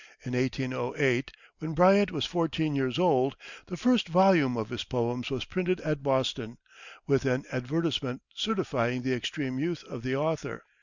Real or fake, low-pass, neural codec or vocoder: real; 7.2 kHz; none